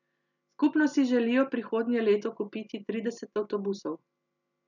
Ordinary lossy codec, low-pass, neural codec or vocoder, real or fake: none; 7.2 kHz; none; real